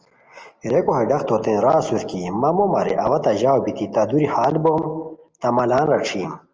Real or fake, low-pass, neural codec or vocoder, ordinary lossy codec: real; 7.2 kHz; none; Opus, 24 kbps